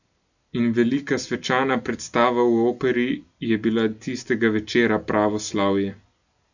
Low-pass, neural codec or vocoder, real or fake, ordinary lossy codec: 7.2 kHz; none; real; none